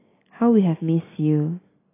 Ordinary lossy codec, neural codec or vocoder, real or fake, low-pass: AAC, 16 kbps; none; real; 3.6 kHz